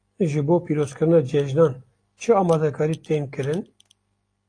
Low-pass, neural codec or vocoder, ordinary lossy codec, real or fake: 9.9 kHz; none; AAC, 48 kbps; real